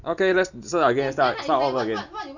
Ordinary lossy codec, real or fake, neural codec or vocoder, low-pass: none; real; none; 7.2 kHz